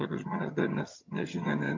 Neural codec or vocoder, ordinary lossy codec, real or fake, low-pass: vocoder, 22.05 kHz, 80 mel bands, HiFi-GAN; MP3, 48 kbps; fake; 7.2 kHz